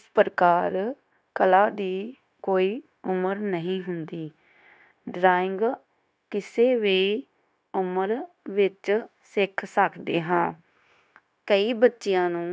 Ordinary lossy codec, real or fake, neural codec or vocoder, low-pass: none; fake; codec, 16 kHz, 0.9 kbps, LongCat-Audio-Codec; none